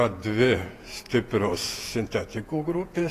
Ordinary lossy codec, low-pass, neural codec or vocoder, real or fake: AAC, 48 kbps; 14.4 kHz; vocoder, 48 kHz, 128 mel bands, Vocos; fake